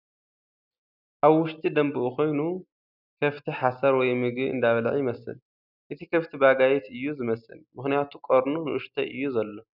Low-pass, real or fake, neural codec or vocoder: 5.4 kHz; real; none